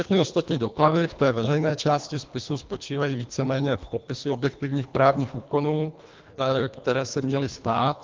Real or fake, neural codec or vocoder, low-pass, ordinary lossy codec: fake; codec, 24 kHz, 1.5 kbps, HILCodec; 7.2 kHz; Opus, 24 kbps